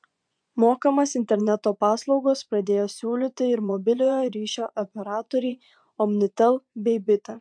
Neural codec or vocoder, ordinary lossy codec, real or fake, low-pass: vocoder, 24 kHz, 100 mel bands, Vocos; MP3, 64 kbps; fake; 9.9 kHz